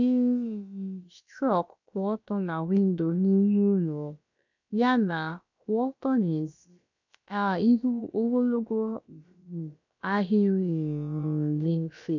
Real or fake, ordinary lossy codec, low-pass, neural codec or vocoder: fake; none; 7.2 kHz; codec, 16 kHz, about 1 kbps, DyCAST, with the encoder's durations